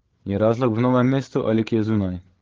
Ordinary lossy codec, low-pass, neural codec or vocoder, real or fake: Opus, 16 kbps; 7.2 kHz; codec, 16 kHz, 16 kbps, FreqCodec, larger model; fake